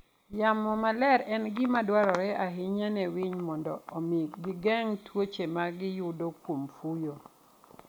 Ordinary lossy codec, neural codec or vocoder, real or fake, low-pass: none; none; real; none